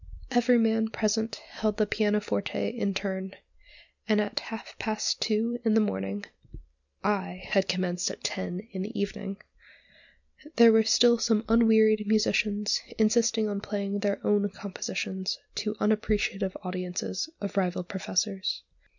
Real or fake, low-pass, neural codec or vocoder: real; 7.2 kHz; none